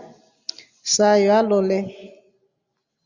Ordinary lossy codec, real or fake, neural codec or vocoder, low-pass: Opus, 64 kbps; real; none; 7.2 kHz